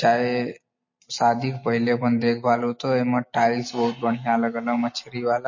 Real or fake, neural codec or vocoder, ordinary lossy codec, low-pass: real; none; MP3, 32 kbps; 7.2 kHz